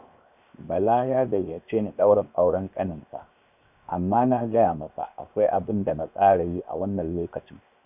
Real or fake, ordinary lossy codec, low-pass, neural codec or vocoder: fake; none; 3.6 kHz; codec, 16 kHz, 0.7 kbps, FocalCodec